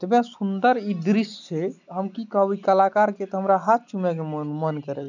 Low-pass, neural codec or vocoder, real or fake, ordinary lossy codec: 7.2 kHz; none; real; none